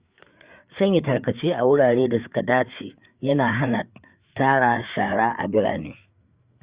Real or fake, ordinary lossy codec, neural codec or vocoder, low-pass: fake; Opus, 64 kbps; codec, 16 kHz, 4 kbps, FreqCodec, larger model; 3.6 kHz